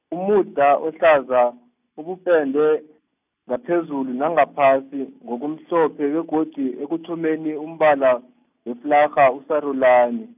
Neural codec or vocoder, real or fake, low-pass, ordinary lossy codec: none; real; 3.6 kHz; none